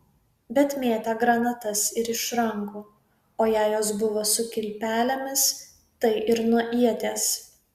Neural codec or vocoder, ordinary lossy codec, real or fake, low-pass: none; Opus, 64 kbps; real; 14.4 kHz